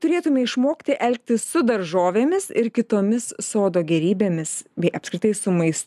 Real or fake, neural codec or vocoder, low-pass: real; none; 14.4 kHz